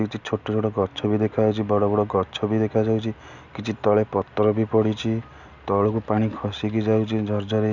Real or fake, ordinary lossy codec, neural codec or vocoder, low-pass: real; none; none; 7.2 kHz